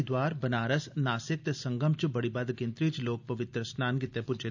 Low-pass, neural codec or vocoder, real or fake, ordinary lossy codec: 7.2 kHz; none; real; none